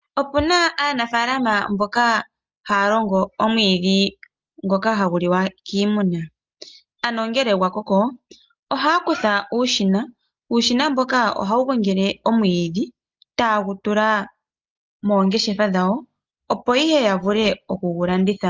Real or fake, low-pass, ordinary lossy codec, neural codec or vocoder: real; 7.2 kHz; Opus, 24 kbps; none